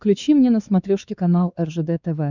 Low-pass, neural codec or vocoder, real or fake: 7.2 kHz; codec, 16 kHz, 4 kbps, X-Codec, WavLM features, trained on Multilingual LibriSpeech; fake